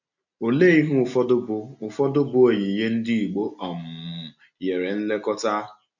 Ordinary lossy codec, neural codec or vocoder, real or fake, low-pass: none; none; real; 7.2 kHz